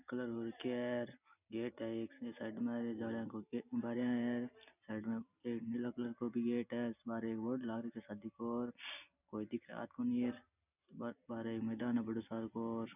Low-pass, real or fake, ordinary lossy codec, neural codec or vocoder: 3.6 kHz; real; none; none